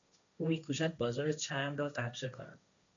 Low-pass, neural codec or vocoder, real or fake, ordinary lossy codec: 7.2 kHz; codec, 16 kHz, 1.1 kbps, Voila-Tokenizer; fake; MP3, 64 kbps